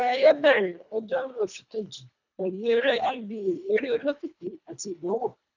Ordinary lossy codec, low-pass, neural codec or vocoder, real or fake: none; 7.2 kHz; codec, 24 kHz, 1.5 kbps, HILCodec; fake